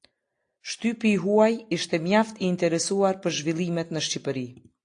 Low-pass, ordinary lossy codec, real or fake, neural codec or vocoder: 9.9 kHz; AAC, 48 kbps; real; none